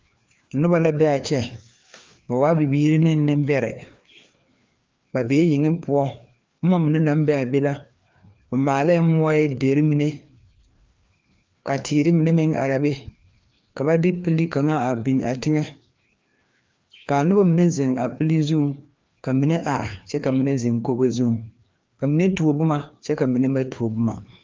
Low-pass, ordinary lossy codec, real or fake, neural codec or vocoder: 7.2 kHz; Opus, 32 kbps; fake; codec, 16 kHz, 2 kbps, FreqCodec, larger model